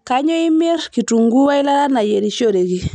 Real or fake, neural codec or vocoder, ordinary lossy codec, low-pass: real; none; none; 9.9 kHz